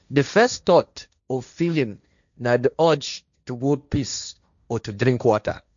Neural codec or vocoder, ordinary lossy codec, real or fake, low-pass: codec, 16 kHz, 1.1 kbps, Voila-Tokenizer; none; fake; 7.2 kHz